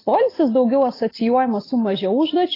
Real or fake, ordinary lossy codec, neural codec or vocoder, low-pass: real; AAC, 24 kbps; none; 5.4 kHz